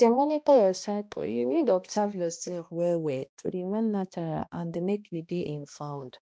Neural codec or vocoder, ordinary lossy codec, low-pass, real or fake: codec, 16 kHz, 1 kbps, X-Codec, HuBERT features, trained on balanced general audio; none; none; fake